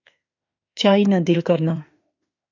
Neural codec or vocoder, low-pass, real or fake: autoencoder, 48 kHz, 32 numbers a frame, DAC-VAE, trained on Japanese speech; 7.2 kHz; fake